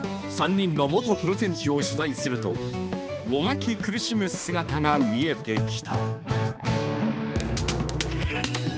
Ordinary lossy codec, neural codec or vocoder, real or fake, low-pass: none; codec, 16 kHz, 2 kbps, X-Codec, HuBERT features, trained on balanced general audio; fake; none